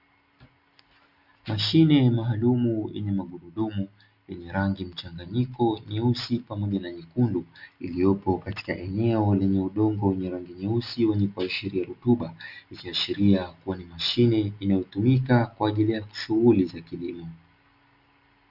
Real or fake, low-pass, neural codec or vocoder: real; 5.4 kHz; none